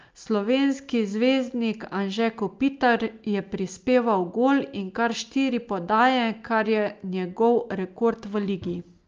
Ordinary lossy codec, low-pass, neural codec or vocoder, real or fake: Opus, 24 kbps; 7.2 kHz; none; real